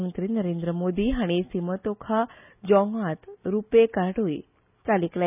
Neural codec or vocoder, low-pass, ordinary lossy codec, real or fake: none; 3.6 kHz; none; real